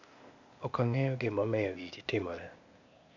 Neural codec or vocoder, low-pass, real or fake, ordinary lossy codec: codec, 16 kHz, 0.8 kbps, ZipCodec; 7.2 kHz; fake; MP3, 64 kbps